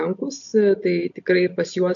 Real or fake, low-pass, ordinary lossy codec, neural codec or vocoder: real; 7.2 kHz; AAC, 64 kbps; none